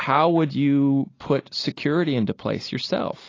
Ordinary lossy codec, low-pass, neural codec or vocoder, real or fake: AAC, 32 kbps; 7.2 kHz; none; real